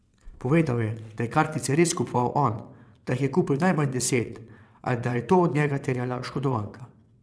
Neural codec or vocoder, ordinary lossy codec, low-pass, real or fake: vocoder, 22.05 kHz, 80 mel bands, WaveNeXt; none; none; fake